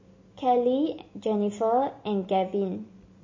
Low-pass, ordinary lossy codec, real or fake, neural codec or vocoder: 7.2 kHz; MP3, 32 kbps; real; none